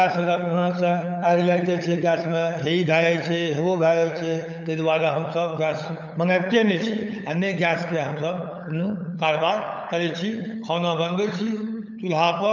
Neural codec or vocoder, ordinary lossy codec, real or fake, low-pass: codec, 16 kHz, 8 kbps, FunCodec, trained on LibriTTS, 25 frames a second; none; fake; 7.2 kHz